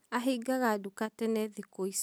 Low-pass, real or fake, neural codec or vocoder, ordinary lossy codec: none; real; none; none